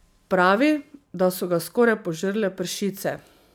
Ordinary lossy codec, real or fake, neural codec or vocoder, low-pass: none; real; none; none